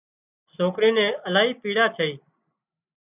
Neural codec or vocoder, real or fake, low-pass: none; real; 3.6 kHz